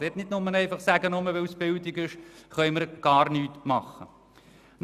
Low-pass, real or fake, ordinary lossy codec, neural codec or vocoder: 14.4 kHz; real; none; none